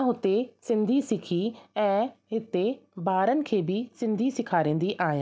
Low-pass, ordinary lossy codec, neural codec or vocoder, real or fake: none; none; none; real